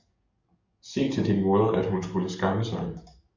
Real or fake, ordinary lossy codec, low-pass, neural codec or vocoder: fake; Opus, 64 kbps; 7.2 kHz; codec, 44.1 kHz, 7.8 kbps, DAC